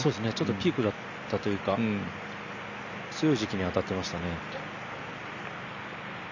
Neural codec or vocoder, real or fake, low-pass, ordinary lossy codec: none; real; 7.2 kHz; none